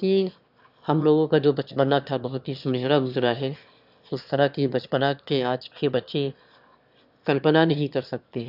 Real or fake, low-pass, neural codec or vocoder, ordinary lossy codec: fake; 5.4 kHz; autoencoder, 22.05 kHz, a latent of 192 numbers a frame, VITS, trained on one speaker; none